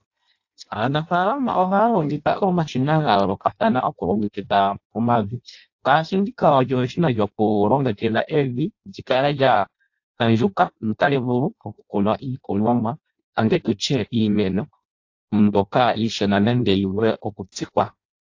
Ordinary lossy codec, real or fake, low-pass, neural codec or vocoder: AAC, 48 kbps; fake; 7.2 kHz; codec, 16 kHz in and 24 kHz out, 0.6 kbps, FireRedTTS-2 codec